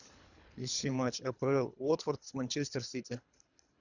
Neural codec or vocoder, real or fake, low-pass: codec, 24 kHz, 3 kbps, HILCodec; fake; 7.2 kHz